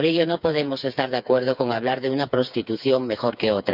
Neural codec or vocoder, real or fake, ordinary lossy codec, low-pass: codec, 16 kHz, 4 kbps, FreqCodec, smaller model; fake; none; 5.4 kHz